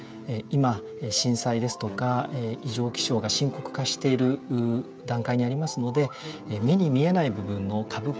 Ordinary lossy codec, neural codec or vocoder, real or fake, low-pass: none; codec, 16 kHz, 16 kbps, FreqCodec, smaller model; fake; none